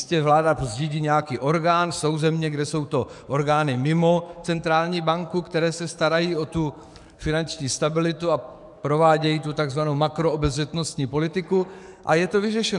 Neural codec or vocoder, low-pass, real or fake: codec, 44.1 kHz, 7.8 kbps, DAC; 10.8 kHz; fake